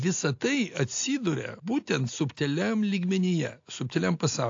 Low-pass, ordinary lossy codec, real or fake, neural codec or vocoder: 7.2 kHz; AAC, 48 kbps; real; none